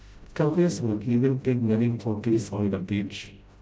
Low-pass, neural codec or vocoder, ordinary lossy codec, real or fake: none; codec, 16 kHz, 0.5 kbps, FreqCodec, smaller model; none; fake